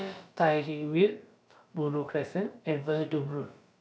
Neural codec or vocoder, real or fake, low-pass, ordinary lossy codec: codec, 16 kHz, about 1 kbps, DyCAST, with the encoder's durations; fake; none; none